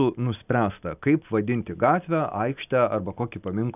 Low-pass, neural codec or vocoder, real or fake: 3.6 kHz; none; real